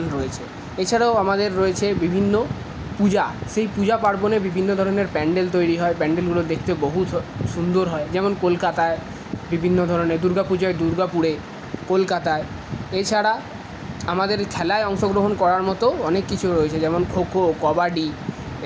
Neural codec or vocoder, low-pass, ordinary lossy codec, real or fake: none; none; none; real